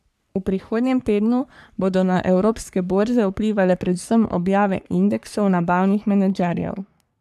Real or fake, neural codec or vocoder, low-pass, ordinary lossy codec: fake; codec, 44.1 kHz, 3.4 kbps, Pupu-Codec; 14.4 kHz; none